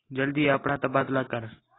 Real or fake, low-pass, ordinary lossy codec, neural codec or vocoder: real; 7.2 kHz; AAC, 16 kbps; none